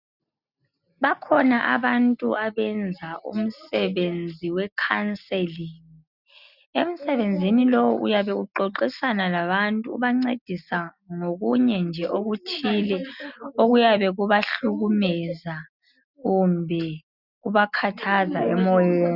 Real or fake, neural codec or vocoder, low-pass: real; none; 5.4 kHz